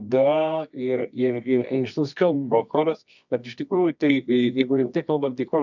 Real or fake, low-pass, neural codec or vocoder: fake; 7.2 kHz; codec, 24 kHz, 0.9 kbps, WavTokenizer, medium music audio release